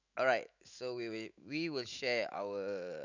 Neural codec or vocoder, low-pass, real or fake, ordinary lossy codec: autoencoder, 48 kHz, 128 numbers a frame, DAC-VAE, trained on Japanese speech; 7.2 kHz; fake; none